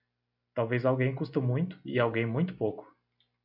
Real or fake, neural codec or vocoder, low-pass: real; none; 5.4 kHz